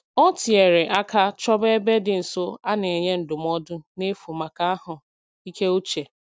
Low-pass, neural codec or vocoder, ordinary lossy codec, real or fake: none; none; none; real